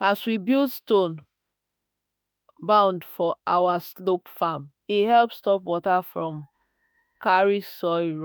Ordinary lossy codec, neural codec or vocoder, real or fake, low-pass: none; autoencoder, 48 kHz, 32 numbers a frame, DAC-VAE, trained on Japanese speech; fake; none